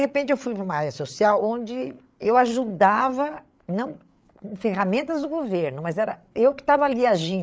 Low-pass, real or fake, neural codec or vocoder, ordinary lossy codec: none; fake; codec, 16 kHz, 8 kbps, FreqCodec, larger model; none